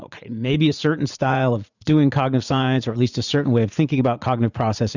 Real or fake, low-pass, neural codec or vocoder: fake; 7.2 kHz; vocoder, 44.1 kHz, 80 mel bands, Vocos